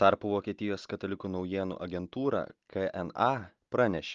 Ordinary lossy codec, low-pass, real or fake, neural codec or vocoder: Opus, 32 kbps; 7.2 kHz; real; none